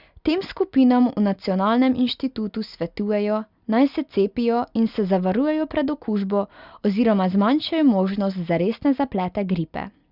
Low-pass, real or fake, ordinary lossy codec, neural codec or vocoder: 5.4 kHz; real; Opus, 64 kbps; none